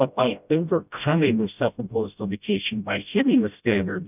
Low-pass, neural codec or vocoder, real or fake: 3.6 kHz; codec, 16 kHz, 0.5 kbps, FreqCodec, smaller model; fake